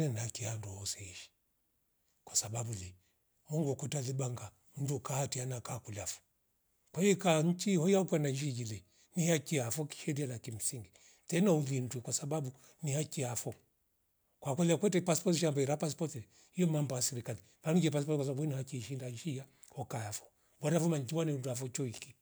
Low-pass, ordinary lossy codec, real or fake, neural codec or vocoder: none; none; real; none